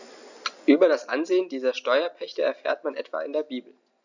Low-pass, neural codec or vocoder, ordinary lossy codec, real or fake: 7.2 kHz; none; none; real